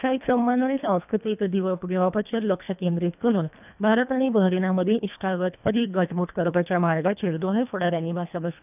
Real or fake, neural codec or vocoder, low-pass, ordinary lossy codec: fake; codec, 24 kHz, 1.5 kbps, HILCodec; 3.6 kHz; none